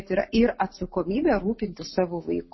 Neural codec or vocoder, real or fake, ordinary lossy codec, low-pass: none; real; MP3, 24 kbps; 7.2 kHz